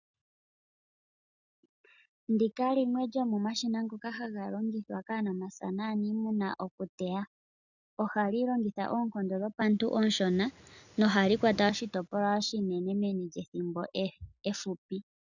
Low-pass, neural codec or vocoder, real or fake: 7.2 kHz; none; real